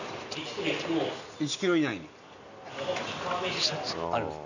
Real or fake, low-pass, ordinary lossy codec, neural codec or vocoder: real; 7.2 kHz; none; none